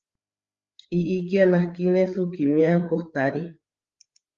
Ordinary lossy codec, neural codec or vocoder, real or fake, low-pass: Opus, 32 kbps; codec, 16 kHz, 4 kbps, FreqCodec, larger model; fake; 7.2 kHz